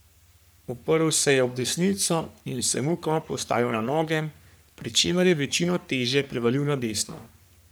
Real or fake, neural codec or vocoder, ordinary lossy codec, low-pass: fake; codec, 44.1 kHz, 3.4 kbps, Pupu-Codec; none; none